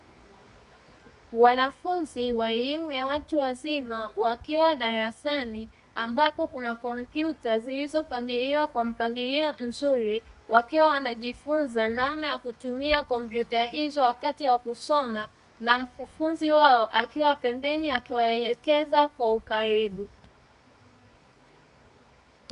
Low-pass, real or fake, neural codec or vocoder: 10.8 kHz; fake; codec, 24 kHz, 0.9 kbps, WavTokenizer, medium music audio release